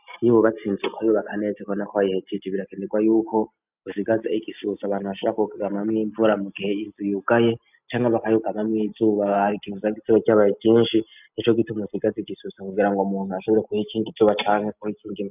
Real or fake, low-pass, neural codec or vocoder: real; 3.6 kHz; none